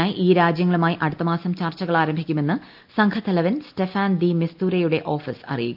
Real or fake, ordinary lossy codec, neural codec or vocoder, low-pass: real; Opus, 32 kbps; none; 5.4 kHz